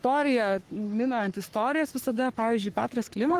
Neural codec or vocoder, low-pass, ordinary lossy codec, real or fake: codec, 44.1 kHz, 3.4 kbps, Pupu-Codec; 14.4 kHz; Opus, 24 kbps; fake